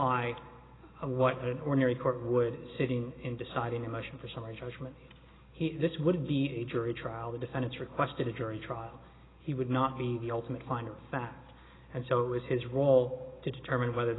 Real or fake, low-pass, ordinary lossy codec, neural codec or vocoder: real; 7.2 kHz; AAC, 16 kbps; none